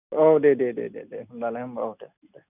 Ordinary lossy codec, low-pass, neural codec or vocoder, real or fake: none; 3.6 kHz; none; real